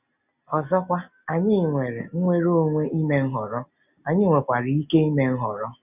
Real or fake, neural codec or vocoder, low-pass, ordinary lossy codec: real; none; 3.6 kHz; none